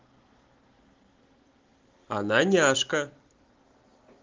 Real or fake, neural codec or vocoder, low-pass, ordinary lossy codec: real; none; 7.2 kHz; Opus, 16 kbps